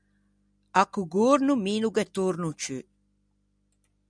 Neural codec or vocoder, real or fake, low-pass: none; real; 9.9 kHz